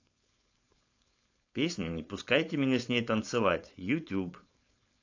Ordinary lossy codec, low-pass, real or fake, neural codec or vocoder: AAC, 48 kbps; 7.2 kHz; fake; codec, 16 kHz, 4.8 kbps, FACodec